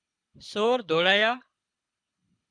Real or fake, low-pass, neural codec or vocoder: fake; 9.9 kHz; codec, 24 kHz, 6 kbps, HILCodec